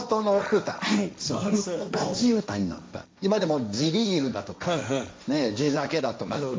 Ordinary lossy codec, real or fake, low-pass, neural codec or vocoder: none; fake; none; codec, 16 kHz, 1.1 kbps, Voila-Tokenizer